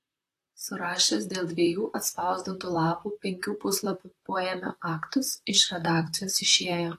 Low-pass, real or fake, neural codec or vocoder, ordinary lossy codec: 14.4 kHz; fake; vocoder, 44.1 kHz, 128 mel bands, Pupu-Vocoder; AAC, 48 kbps